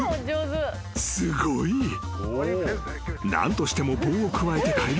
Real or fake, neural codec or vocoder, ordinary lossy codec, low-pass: real; none; none; none